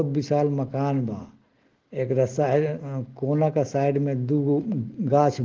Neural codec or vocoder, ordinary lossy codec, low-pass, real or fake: none; Opus, 16 kbps; 7.2 kHz; real